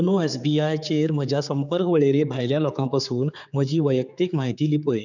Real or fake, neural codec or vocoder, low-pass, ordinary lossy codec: fake; codec, 16 kHz, 4 kbps, X-Codec, HuBERT features, trained on general audio; 7.2 kHz; none